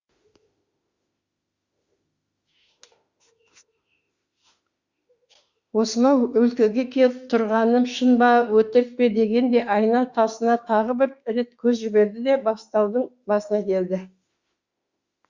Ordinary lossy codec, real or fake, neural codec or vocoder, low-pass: Opus, 64 kbps; fake; autoencoder, 48 kHz, 32 numbers a frame, DAC-VAE, trained on Japanese speech; 7.2 kHz